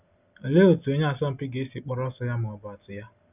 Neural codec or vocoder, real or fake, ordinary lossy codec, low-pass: none; real; none; 3.6 kHz